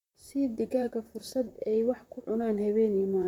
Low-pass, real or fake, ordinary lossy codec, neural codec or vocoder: 19.8 kHz; fake; MP3, 96 kbps; vocoder, 44.1 kHz, 128 mel bands, Pupu-Vocoder